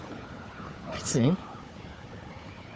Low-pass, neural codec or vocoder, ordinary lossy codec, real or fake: none; codec, 16 kHz, 4 kbps, FunCodec, trained on Chinese and English, 50 frames a second; none; fake